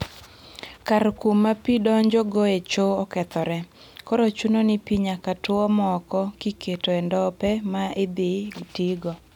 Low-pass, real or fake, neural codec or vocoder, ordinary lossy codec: 19.8 kHz; real; none; none